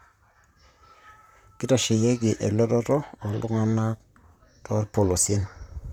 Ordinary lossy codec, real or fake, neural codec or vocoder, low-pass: none; fake; vocoder, 44.1 kHz, 128 mel bands, Pupu-Vocoder; 19.8 kHz